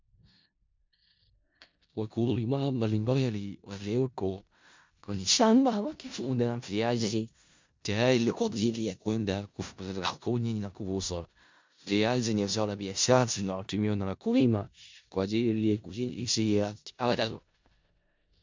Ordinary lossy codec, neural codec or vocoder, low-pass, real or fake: MP3, 64 kbps; codec, 16 kHz in and 24 kHz out, 0.4 kbps, LongCat-Audio-Codec, four codebook decoder; 7.2 kHz; fake